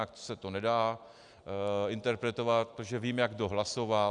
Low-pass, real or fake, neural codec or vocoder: 10.8 kHz; real; none